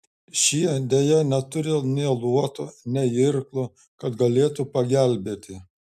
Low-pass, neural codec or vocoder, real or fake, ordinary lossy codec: 14.4 kHz; none; real; MP3, 96 kbps